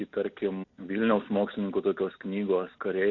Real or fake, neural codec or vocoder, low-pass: real; none; 7.2 kHz